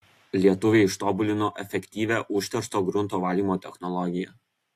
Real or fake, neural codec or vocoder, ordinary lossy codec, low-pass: real; none; AAC, 64 kbps; 14.4 kHz